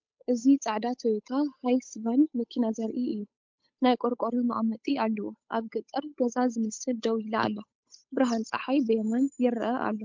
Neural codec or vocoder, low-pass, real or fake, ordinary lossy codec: codec, 16 kHz, 8 kbps, FunCodec, trained on Chinese and English, 25 frames a second; 7.2 kHz; fake; MP3, 64 kbps